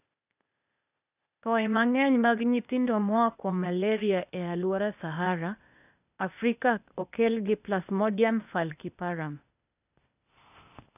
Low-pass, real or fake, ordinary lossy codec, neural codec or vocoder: 3.6 kHz; fake; none; codec, 16 kHz, 0.8 kbps, ZipCodec